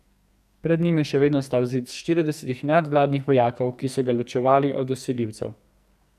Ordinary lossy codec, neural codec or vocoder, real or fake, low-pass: none; codec, 44.1 kHz, 2.6 kbps, SNAC; fake; 14.4 kHz